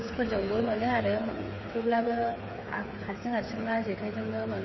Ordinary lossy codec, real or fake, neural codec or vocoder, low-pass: MP3, 24 kbps; fake; codec, 16 kHz, 8 kbps, FreqCodec, smaller model; 7.2 kHz